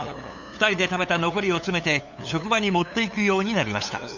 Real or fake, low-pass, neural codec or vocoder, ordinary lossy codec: fake; 7.2 kHz; codec, 16 kHz, 8 kbps, FunCodec, trained on LibriTTS, 25 frames a second; none